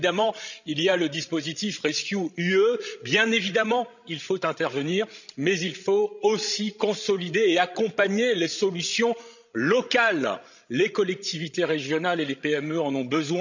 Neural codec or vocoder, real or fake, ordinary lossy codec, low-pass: codec, 16 kHz, 16 kbps, FreqCodec, larger model; fake; none; 7.2 kHz